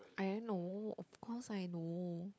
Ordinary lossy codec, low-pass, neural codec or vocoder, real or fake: none; none; codec, 16 kHz, 16 kbps, FunCodec, trained on LibriTTS, 50 frames a second; fake